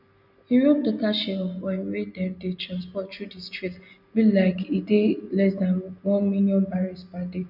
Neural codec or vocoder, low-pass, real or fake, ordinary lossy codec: none; 5.4 kHz; real; none